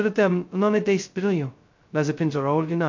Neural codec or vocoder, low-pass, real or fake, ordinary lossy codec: codec, 16 kHz, 0.2 kbps, FocalCodec; 7.2 kHz; fake; MP3, 48 kbps